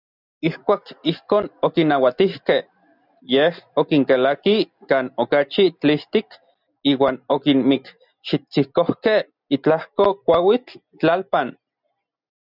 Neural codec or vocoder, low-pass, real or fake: none; 5.4 kHz; real